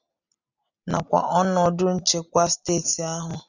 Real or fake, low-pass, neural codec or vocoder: real; 7.2 kHz; none